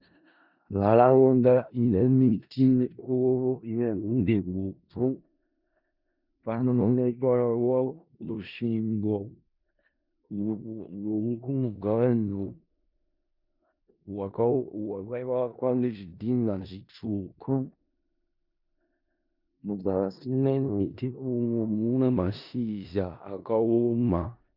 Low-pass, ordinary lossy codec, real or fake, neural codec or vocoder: 5.4 kHz; Opus, 64 kbps; fake; codec, 16 kHz in and 24 kHz out, 0.4 kbps, LongCat-Audio-Codec, four codebook decoder